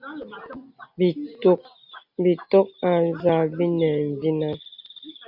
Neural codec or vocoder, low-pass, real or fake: none; 5.4 kHz; real